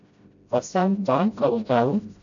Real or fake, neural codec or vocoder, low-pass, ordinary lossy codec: fake; codec, 16 kHz, 0.5 kbps, FreqCodec, smaller model; 7.2 kHz; MP3, 64 kbps